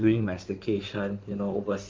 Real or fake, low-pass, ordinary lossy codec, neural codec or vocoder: fake; 7.2 kHz; Opus, 24 kbps; codec, 16 kHz in and 24 kHz out, 2.2 kbps, FireRedTTS-2 codec